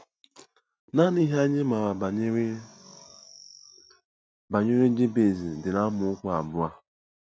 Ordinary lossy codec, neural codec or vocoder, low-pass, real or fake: none; none; none; real